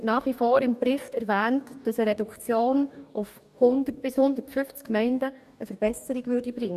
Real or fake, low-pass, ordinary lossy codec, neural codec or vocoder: fake; 14.4 kHz; none; codec, 44.1 kHz, 2.6 kbps, DAC